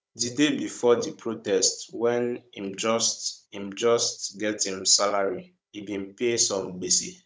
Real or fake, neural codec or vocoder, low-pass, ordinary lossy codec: fake; codec, 16 kHz, 16 kbps, FunCodec, trained on Chinese and English, 50 frames a second; none; none